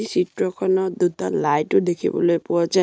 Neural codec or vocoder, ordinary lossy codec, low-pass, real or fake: none; none; none; real